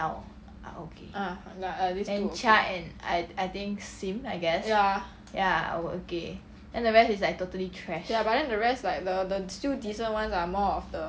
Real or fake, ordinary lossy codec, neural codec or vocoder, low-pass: real; none; none; none